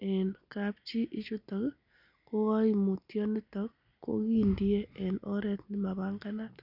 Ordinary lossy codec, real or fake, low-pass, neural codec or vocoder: none; real; 5.4 kHz; none